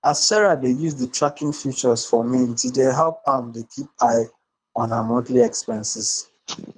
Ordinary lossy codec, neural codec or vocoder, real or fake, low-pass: none; codec, 24 kHz, 3 kbps, HILCodec; fake; 9.9 kHz